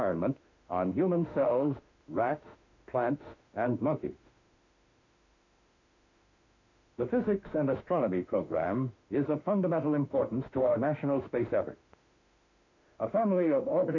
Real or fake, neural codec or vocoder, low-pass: fake; autoencoder, 48 kHz, 32 numbers a frame, DAC-VAE, trained on Japanese speech; 7.2 kHz